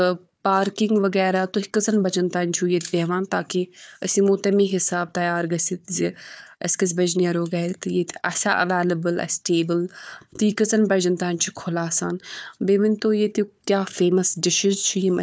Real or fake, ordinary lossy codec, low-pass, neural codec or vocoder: fake; none; none; codec, 16 kHz, 4 kbps, FunCodec, trained on Chinese and English, 50 frames a second